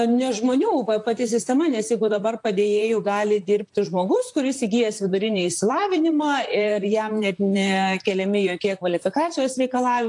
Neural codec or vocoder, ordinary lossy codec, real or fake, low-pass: vocoder, 44.1 kHz, 128 mel bands, Pupu-Vocoder; AAC, 64 kbps; fake; 10.8 kHz